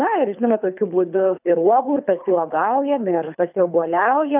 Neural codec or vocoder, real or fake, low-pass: codec, 24 kHz, 3 kbps, HILCodec; fake; 3.6 kHz